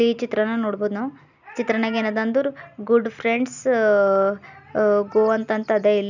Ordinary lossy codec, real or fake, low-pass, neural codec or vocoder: none; real; 7.2 kHz; none